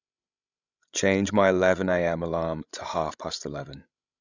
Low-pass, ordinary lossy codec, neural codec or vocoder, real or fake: 7.2 kHz; Opus, 64 kbps; codec, 16 kHz, 16 kbps, FreqCodec, larger model; fake